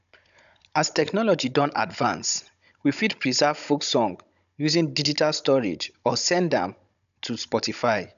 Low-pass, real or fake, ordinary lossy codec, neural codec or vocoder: 7.2 kHz; fake; none; codec, 16 kHz, 16 kbps, FunCodec, trained on Chinese and English, 50 frames a second